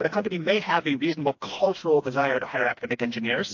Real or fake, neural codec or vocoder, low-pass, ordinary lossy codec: fake; codec, 16 kHz, 1 kbps, FreqCodec, smaller model; 7.2 kHz; AAC, 48 kbps